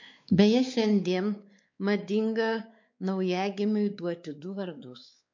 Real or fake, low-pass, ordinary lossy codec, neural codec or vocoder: fake; 7.2 kHz; MP3, 48 kbps; codec, 16 kHz, 4 kbps, X-Codec, WavLM features, trained on Multilingual LibriSpeech